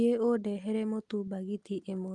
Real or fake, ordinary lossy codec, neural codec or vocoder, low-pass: real; Opus, 32 kbps; none; 10.8 kHz